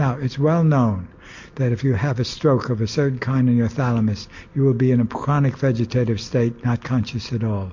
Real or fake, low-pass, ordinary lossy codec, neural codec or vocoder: real; 7.2 kHz; MP3, 48 kbps; none